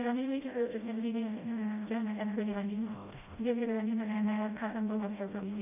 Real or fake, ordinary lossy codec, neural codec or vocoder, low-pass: fake; none; codec, 16 kHz, 0.5 kbps, FreqCodec, smaller model; 3.6 kHz